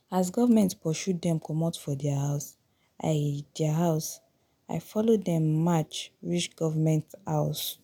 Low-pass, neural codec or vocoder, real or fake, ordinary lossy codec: none; none; real; none